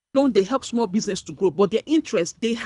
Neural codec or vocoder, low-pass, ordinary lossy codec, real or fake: codec, 24 kHz, 3 kbps, HILCodec; 10.8 kHz; none; fake